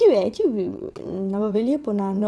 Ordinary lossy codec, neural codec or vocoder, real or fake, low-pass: none; vocoder, 22.05 kHz, 80 mel bands, WaveNeXt; fake; none